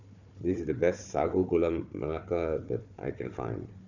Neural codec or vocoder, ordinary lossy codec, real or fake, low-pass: codec, 16 kHz, 4 kbps, FunCodec, trained on Chinese and English, 50 frames a second; none; fake; 7.2 kHz